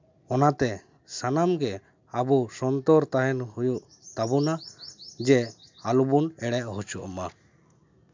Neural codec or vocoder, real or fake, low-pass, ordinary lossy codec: none; real; 7.2 kHz; MP3, 64 kbps